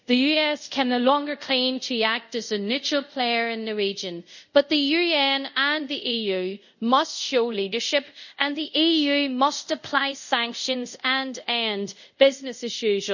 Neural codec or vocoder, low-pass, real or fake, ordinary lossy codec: codec, 24 kHz, 0.5 kbps, DualCodec; 7.2 kHz; fake; none